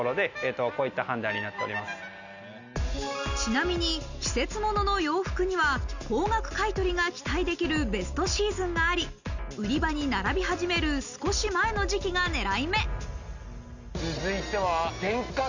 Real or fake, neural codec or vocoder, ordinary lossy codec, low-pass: real; none; none; 7.2 kHz